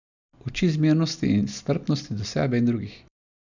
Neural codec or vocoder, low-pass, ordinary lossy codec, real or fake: none; 7.2 kHz; none; real